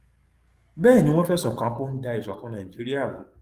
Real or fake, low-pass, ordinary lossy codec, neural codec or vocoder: fake; 14.4 kHz; Opus, 32 kbps; codec, 44.1 kHz, 7.8 kbps, DAC